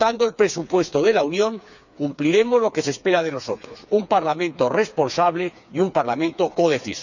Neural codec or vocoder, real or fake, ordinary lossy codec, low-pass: codec, 16 kHz, 4 kbps, FreqCodec, smaller model; fake; none; 7.2 kHz